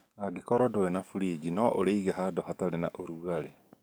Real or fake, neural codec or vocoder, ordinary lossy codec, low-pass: fake; codec, 44.1 kHz, 7.8 kbps, DAC; none; none